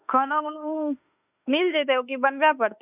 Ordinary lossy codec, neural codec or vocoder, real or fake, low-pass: none; autoencoder, 48 kHz, 32 numbers a frame, DAC-VAE, trained on Japanese speech; fake; 3.6 kHz